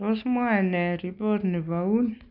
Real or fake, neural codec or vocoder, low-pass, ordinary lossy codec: real; none; 5.4 kHz; none